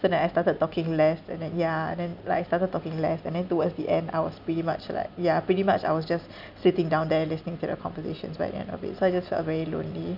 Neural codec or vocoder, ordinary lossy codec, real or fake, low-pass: none; none; real; 5.4 kHz